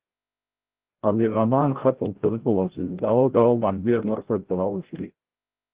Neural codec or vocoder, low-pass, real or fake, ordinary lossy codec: codec, 16 kHz, 0.5 kbps, FreqCodec, larger model; 3.6 kHz; fake; Opus, 16 kbps